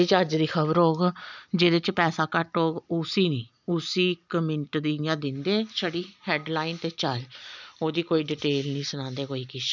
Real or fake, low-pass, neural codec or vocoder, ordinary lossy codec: real; 7.2 kHz; none; none